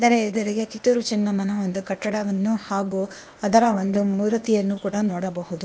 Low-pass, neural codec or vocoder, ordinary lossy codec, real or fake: none; codec, 16 kHz, 0.8 kbps, ZipCodec; none; fake